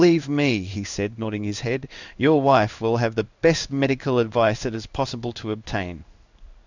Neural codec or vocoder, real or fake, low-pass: codec, 16 kHz in and 24 kHz out, 1 kbps, XY-Tokenizer; fake; 7.2 kHz